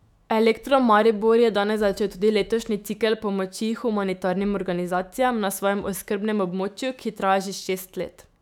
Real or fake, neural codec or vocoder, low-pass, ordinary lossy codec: fake; autoencoder, 48 kHz, 128 numbers a frame, DAC-VAE, trained on Japanese speech; 19.8 kHz; none